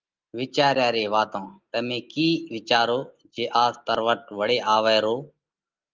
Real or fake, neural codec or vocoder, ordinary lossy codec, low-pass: real; none; Opus, 32 kbps; 7.2 kHz